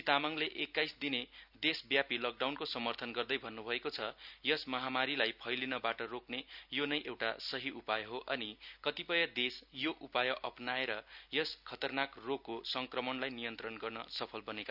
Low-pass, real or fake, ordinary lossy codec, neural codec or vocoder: 5.4 kHz; real; none; none